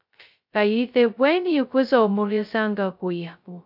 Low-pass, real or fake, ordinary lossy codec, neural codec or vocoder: 5.4 kHz; fake; AAC, 48 kbps; codec, 16 kHz, 0.2 kbps, FocalCodec